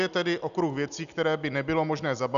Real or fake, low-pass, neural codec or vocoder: real; 7.2 kHz; none